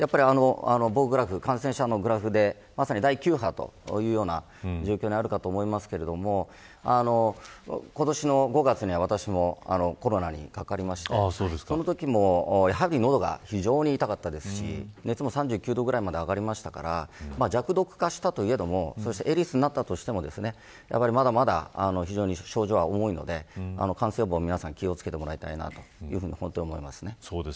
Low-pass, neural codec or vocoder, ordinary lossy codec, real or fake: none; none; none; real